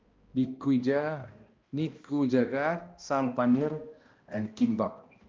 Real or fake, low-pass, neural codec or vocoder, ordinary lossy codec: fake; 7.2 kHz; codec, 16 kHz, 1 kbps, X-Codec, HuBERT features, trained on balanced general audio; Opus, 32 kbps